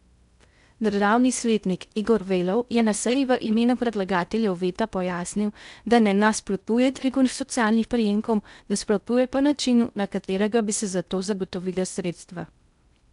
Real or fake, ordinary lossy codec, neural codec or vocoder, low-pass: fake; none; codec, 16 kHz in and 24 kHz out, 0.6 kbps, FocalCodec, streaming, 2048 codes; 10.8 kHz